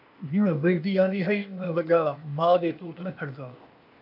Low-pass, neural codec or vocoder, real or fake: 5.4 kHz; codec, 16 kHz, 0.8 kbps, ZipCodec; fake